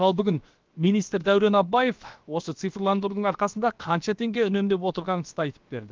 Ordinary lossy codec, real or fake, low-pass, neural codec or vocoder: Opus, 32 kbps; fake; 7.2 kHz; codec, 16 kHz, about 1 kbps, DyCAST, with the encoder's durations